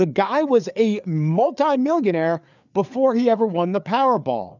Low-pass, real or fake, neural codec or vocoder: 7.2 kHz; fake; codec, 16 kHz, 4 kbps, FreqCodec, larger model